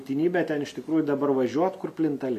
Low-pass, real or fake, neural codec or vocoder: 14.4 kHz; real; none